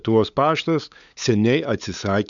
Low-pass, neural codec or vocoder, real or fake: 7.2 kHz; codec, 16 kHz, 8 kbps, FunCodec, trained on LibriTTS, 25 frames a second; fake